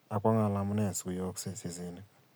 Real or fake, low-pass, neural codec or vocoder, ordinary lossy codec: real; none; none; none